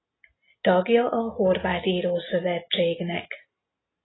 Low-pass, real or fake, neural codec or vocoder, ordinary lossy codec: 7.2 kHz; real; none; AAC, 16 kbps